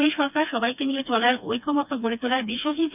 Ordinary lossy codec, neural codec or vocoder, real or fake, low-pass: none; codec, 16 kHz, 2 kbps, FreqCodec, smaller model; fake; 3.6 kHz